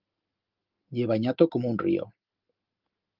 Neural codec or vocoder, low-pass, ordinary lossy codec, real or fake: none; 5.4 kHz; Opus, 32 kbps; real